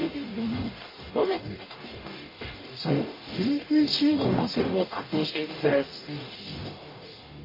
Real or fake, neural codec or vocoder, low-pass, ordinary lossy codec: fake; codec, 44.1 kHz, 0.9 kbps, DAC; 5.4 kHz; MP3, 24 kbps